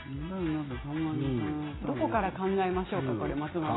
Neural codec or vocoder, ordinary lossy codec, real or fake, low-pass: none; AAC, 16 kbps; real; 7.2 kHz